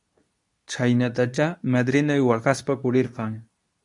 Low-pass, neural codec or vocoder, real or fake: 10.8 kHz; codec, 24 kHz, 0.9 kbps, WavTokenizer, medium speech release version 2; fake